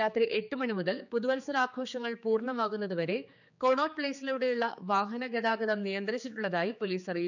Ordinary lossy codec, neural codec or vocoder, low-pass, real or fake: none; codec, 16 kHz, 4 kbps, X-Codec, HuBERT features, trained on general audio; 7.2 kHz; fake